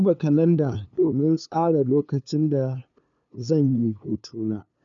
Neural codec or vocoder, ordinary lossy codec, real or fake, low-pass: codec, 16 kHz, 2 kbps, FunCodec, trained on LibriTTS, 25 frames a second; none; fake; 7.2 kHz